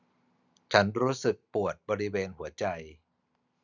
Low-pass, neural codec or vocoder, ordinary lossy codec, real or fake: 7.2 kHz; none; none; real